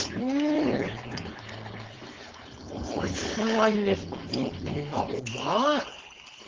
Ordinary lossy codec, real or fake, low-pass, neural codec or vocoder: Opus, 16 kbps; fake; 7.2 kHz; codec, 16 kHz, 4.8 kbps, FACodec